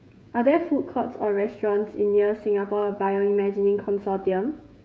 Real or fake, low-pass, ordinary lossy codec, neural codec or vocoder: fake; none; none; codec, 16 kHz, 16 kbps, FreqCodec, smaller model